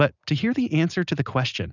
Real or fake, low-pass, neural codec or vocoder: real; 7.2 kHz; none